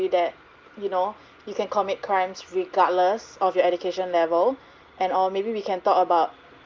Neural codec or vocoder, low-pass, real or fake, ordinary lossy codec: none; 7.2 kHz; real; Opus, 24 kbps